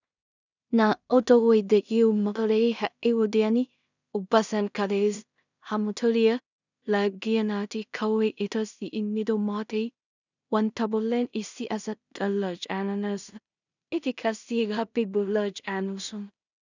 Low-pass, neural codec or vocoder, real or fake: 7.2 kHz; codec, 16 kHz in and 24 kHz out, 0.4 kbps, LongCat-Audio-Codec, two codebook decoder; fake